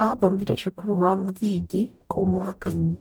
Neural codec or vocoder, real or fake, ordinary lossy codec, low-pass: codec, 44.1 kHz, 0.9 kbps, DAC; fake; none; none